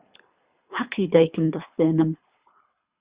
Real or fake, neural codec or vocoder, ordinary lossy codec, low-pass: fake; codec, 24 kHz, 3 kbps, HILCodec; Opus, 64 kbps; 3.6 kHz